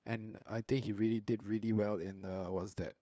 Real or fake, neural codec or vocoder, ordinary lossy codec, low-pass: fake; codec, 16 kHz, 2 kbps, FunCodec, trained on LibriTTS, 25 frames a second; none; none